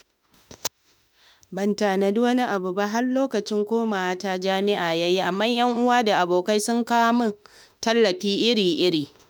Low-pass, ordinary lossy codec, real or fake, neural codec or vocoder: none; none; fake; autoencoder, 48 kHz, 32 numbers a frame, DAC-VAE, trained on Japanese speech